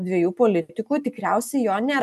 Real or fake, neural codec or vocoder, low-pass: real; none; 14.4 kHz